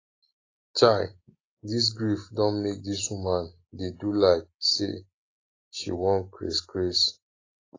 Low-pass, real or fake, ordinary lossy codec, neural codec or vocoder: 7.2 kHz; real; AAC, 32 kbps; none